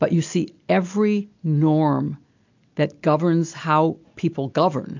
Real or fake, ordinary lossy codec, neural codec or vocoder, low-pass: real; AAC, 48 kbps; none; 7.2 kHz